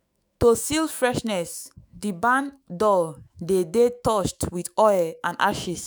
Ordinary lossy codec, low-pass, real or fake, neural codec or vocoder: none; none; fake; autoencoder, 48 kHz, 128 numbers a frame, DAC-VAE, trained on Japanese speech